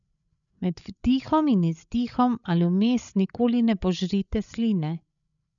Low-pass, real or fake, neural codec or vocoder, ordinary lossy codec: 7.2 kHz; fake; codec, 16 kHz, 16 kbps, FreqCodec, larger model; none